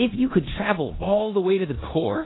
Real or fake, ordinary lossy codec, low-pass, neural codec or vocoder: fake; AAC, 16 kbps; 7.2 kHz; codec, 16 kHz in and 24 kHz out, 0.9 kbps, LongCat-Audio-Codec, four codebook decoder